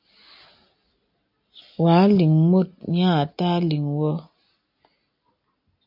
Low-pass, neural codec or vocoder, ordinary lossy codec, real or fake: 5.4 kHz; none; AAC, 48 kbps; real